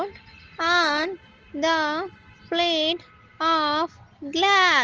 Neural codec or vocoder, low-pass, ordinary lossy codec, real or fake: none; 7.2 kHz; Opus, 16 kbps; real